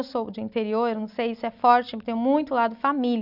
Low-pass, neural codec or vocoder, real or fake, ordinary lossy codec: 5.4 kHz; none; real; none